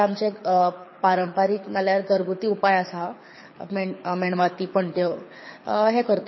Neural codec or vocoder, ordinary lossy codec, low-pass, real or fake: codec, 24 kHz, 6 kbps, HILCodec; MP3, 24 kbps; 7.2 kHz; fake